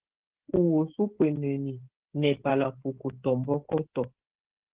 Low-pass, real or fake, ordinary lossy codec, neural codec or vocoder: 3.6 kHz; fake; Opus, 16 kbps; codec, 16 kHz, 16 kbps, FreqCodec, smaller model